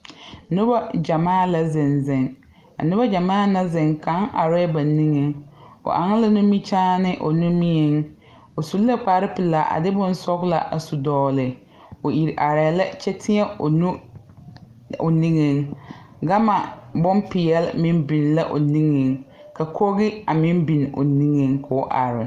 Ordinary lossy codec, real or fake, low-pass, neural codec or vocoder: Opus, 32 kbps; real; 14.4 kHz; none